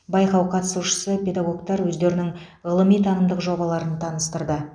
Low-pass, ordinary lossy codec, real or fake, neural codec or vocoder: 9.9 kHz; none; real; none